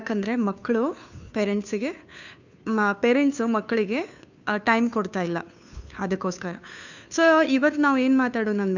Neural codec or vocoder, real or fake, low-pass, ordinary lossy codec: codec, 16 kHz, 2 kbps, FunCodec, trained on Chinese and English, 25 frames a second; fake; 7.2 kHz; none